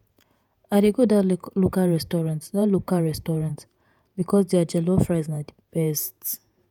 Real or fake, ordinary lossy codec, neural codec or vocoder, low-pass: fake; none; vocoder, 48 kHz, 128 mel bands, Vocos; 19.8 kHz